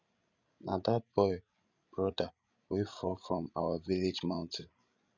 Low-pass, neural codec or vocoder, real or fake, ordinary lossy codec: 7.2 kHz; codec, 16 kHz, 16 kbps, FreqCodec, larger model; fake; none